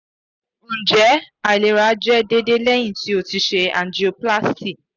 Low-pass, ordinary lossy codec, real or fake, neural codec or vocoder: 7.2 kHz; none; real; none